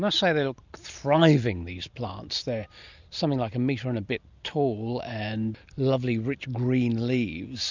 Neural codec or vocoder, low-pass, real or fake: none; 7.2 kHz; real